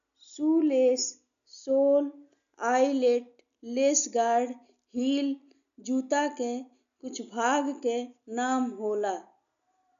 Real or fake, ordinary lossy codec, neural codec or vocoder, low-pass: real; none; none; 7.2 kHz